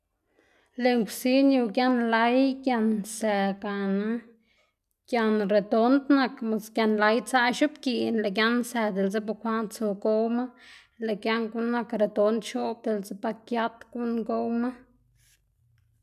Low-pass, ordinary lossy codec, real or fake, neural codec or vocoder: 14.4 kHz; none; real; none